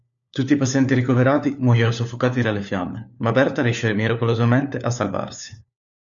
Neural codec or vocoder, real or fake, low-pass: codec, 16 kHz, 4 kbps, FunCodec, trained on LibriTTS, 50 frames a second; fake; 7.2 kHz